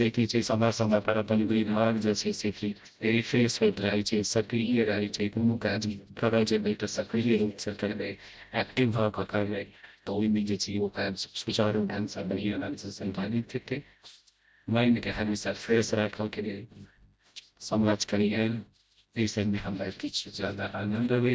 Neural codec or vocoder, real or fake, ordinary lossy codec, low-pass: codec, 16 kHz, 0.5 kbps, FreqCodec, smaller model; fake; none; none